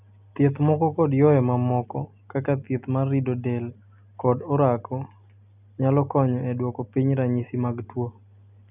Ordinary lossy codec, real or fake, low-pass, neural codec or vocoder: none; real; 3.6 kHz; none